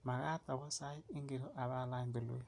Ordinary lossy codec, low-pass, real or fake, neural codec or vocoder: AAC, 64 kbps; 10.8 kHz; real; none